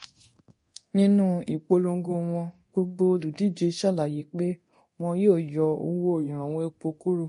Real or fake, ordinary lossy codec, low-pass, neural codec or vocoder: fake; MP3, 48 kbps; 10.8 kHz; codec, 24 kHz, 0.9 kbps, DualCodec